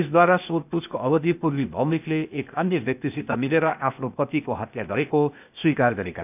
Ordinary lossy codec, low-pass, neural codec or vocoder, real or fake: none; 3.6 kHz; codec, 16 kHz, 0.8 kbps, ZipCodec; fake